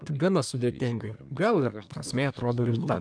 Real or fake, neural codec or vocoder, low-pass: fake; codec, 24 kHz, 1 kbps, SNAC; 9.9 kHz